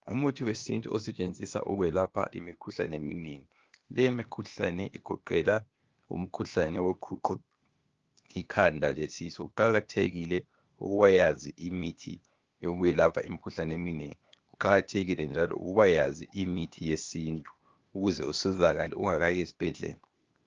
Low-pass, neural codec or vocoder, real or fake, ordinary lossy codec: 7.2 kHz; codec, 16 kHz, 0.8 kbps, ZipCodec; fake; Opus, 24 kbps